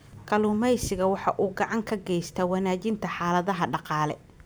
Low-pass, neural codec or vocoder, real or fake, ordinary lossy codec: none; vocoder, 44.1 kHz, 128 mel bands every 512 samples, BigVGAN v2; fake; none